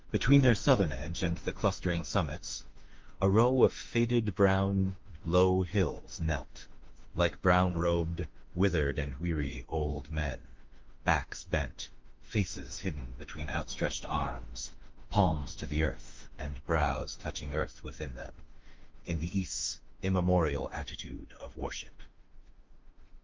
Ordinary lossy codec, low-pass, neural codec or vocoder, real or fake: Opus, 24 kbps; 7.2 kHz; autoencoder, 48 kHz, 32 numbers a frame, DAC-VAE, trained on Japanese speech; fake